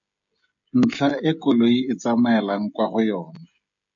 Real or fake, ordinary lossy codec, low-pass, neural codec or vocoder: fake; MP3, 48 kbps; 7.2 kHz; codec, 16 kHz, 16 kbps, FreqCodec, smaller model